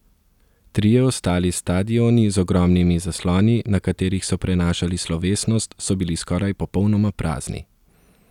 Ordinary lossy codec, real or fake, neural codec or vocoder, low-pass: none; real; none; 19.8 kHz